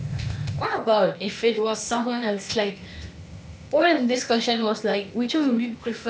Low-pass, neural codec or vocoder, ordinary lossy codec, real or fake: none; codec, 16 kHz, 0.8 kbps, ZipCodec; none; fake